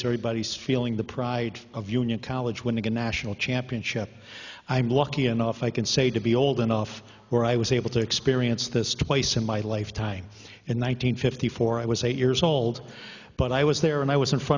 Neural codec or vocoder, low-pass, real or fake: none; 7.2 kHz; real